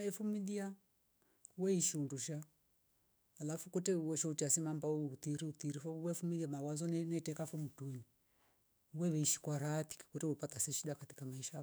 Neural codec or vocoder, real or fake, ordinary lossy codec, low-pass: autoencoder, 48 kHz, 128 numbers a frame, DAC-VAE, trained on Japanese speech; fake; none; none